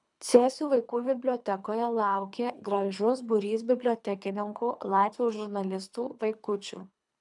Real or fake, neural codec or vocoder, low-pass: fake; codec, 24 kHz, 3 kbps, HILCodec; 10.8 kHz